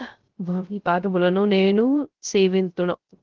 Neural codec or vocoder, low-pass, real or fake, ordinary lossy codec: codec, 16 kHz, 0.3 kbps, FocalCodec; 7.2 kHz; fake; Opus, 16 kbps